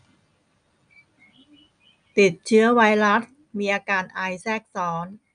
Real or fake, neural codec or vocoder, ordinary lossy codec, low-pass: real; none; none; 9.9 kHz